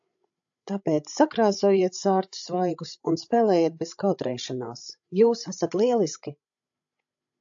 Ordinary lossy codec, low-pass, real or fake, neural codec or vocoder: AAC, 64 kbps; 7.2 kHz; fake; codec, 16 kHz, 16 kbps, FreqCodec, larger model